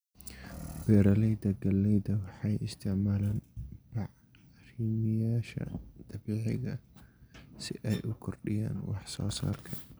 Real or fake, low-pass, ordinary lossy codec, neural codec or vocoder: real; none; none; none